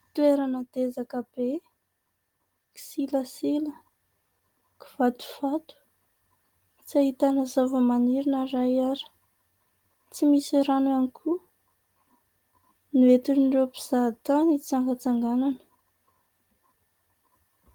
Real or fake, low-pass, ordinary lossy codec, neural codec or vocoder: real; 19.8 kHz; Opus, 24 kbps; none